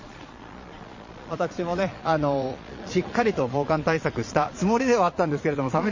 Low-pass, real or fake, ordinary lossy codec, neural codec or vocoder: 7.2 kHz; fake; MP3, 32 kbps; vocoder, 22.05 kHz, 80 mel bands, Vocos